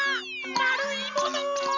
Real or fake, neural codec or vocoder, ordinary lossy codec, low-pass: real; none; none; 7.2 kHz